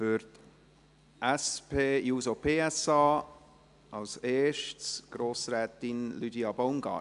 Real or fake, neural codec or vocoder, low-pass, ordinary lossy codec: real; none; 10.8 kHz; AAC, 96 kbps